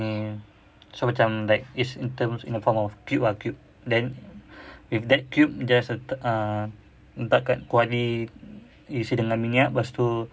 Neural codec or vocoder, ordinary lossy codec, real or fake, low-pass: none; none; real; none